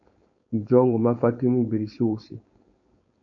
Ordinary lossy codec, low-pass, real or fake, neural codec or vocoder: AAC, 64 kbps; 7.2 kHz; fake; codec, 16 kHz, 4.8 kbps, FACodec